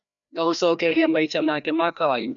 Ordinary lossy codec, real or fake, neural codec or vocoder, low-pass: none; fake; codec, 16 kHz, 1 kbps, FreqCodec, larger model; 7.2 kHz